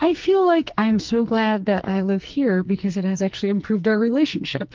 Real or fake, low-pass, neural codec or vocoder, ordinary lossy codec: fake; 7.2 kHz; codec, 44.1 kHz, 2.6 kbps, SNAC; Opus, 32 kbps